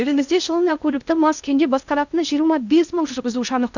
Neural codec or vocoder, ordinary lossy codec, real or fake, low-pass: codec, 16 kHz in and 24 kHz out, 0.6 kbps, FocalCodec, streaming, 2048 codes; none; fake; 7.2 kHz